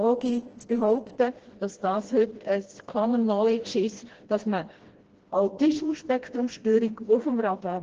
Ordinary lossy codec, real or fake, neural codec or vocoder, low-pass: Opus, 16 kbps; fake; codec, 16 kHz, 1 kbps, FreqCodec, smaller model; 7.2 kHz